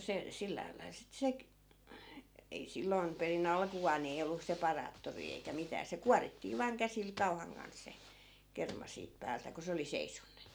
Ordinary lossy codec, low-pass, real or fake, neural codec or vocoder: none; none; real; none